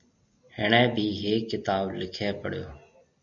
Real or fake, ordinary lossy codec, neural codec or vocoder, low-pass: real; MP3, 96 kbps; none; 7.2 kHz